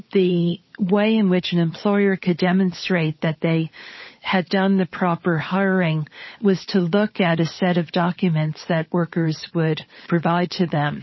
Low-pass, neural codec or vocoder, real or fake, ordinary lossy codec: 7.2 kHz; codec, 16 kHz, 16 kbps, FunCodec, trained on LibriTTS, 50 frames a second; fake; MP3, 24 kbps